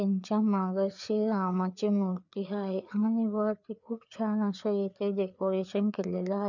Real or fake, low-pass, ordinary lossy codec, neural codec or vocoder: fake; 7.2 kHz; none; codec, 16 kHz, 4 kbps, FreqCodec, larger model